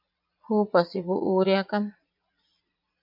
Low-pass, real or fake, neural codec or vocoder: 5.4 kHz; fake; vocoder, 44.1 kHz, 80 mel bands, Vocos